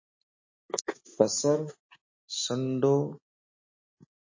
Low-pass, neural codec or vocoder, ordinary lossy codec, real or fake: 7.2 kHz; none; MP3, 32 kbps; real